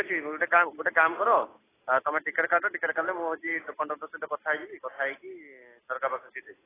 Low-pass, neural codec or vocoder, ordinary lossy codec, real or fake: 3.6 kHz; none; AAC, 16 kbps; real